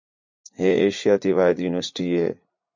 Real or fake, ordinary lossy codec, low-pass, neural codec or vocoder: fake; MP3, 48 kbps; 7.2 kHz; vocoder, 44.1 kHz, 80 mel bands, Vocos